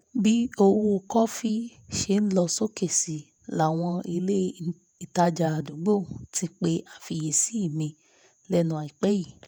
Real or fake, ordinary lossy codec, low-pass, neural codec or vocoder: fake; none; none; vocoder, 48 kHz, 128 mel bands, Vocos